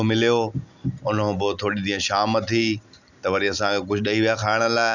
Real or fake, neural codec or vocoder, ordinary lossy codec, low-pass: real; none; none; 7.2 kHz